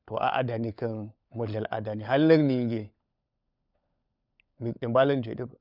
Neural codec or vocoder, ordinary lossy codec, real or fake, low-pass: codec, 16 kHz, 16 kbps, FunCodec, trained on LibriTTS, 50 frames a second; none; fake; 5.4 kHz